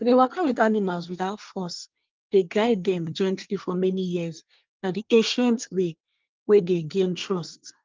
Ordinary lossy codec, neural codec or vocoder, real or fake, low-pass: Opus, 24 kbps; codec, 24 kHz, 1 kbps, SNAC; fake; 7.2 kHz